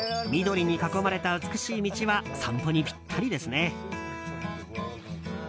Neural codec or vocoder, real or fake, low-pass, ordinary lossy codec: none; real; none; none